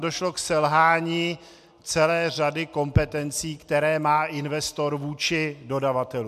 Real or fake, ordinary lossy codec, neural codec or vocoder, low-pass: real; MP3, 96 kbps; none; 14.4 kHz